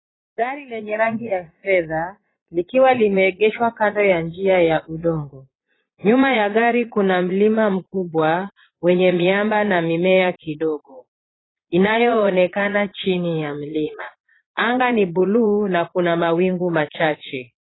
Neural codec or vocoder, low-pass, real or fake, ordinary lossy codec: vocoder, 22.05 kHz, 80 mel bands, Vocos; 7.2 kHz; fake; AAC, 16 kbps